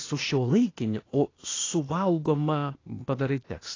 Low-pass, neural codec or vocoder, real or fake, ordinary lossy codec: 7.2 kHz; codec, 16 kHz, 0.8 kbps, ZipCodec; fake; AAC, 32 kbps